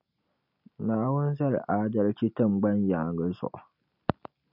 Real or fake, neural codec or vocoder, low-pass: real; none; 5.4 kHz